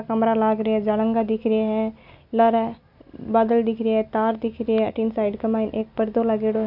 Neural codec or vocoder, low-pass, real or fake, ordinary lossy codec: none; 5.4 kHz; real; none